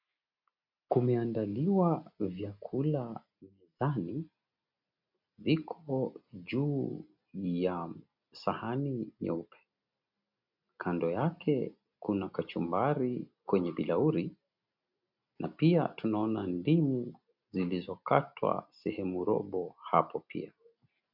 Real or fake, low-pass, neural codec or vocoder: real; 5.4 kHz; none